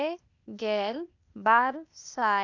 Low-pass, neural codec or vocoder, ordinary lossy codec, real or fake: 7.2 kHz; codec, 16 kHz, 0.8 kbps, ZipCodec; none; fake